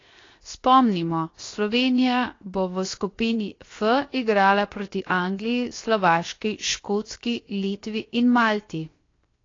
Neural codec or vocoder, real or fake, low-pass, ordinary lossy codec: codec, 16 kHz, 0.7 kbps, FocalCodec; fake; 7.2 kHz; AAC, 32 kbps